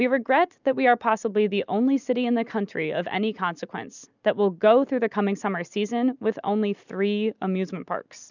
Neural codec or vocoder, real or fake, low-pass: none; real; 7.2 kHz